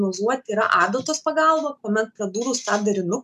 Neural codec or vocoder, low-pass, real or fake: none; 14.4 kHz; real